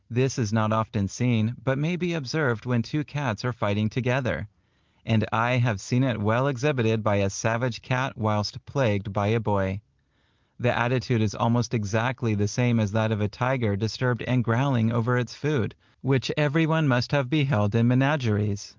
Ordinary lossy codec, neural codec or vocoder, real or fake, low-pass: Opus, 32 kbps; none; real; 7.2 kHz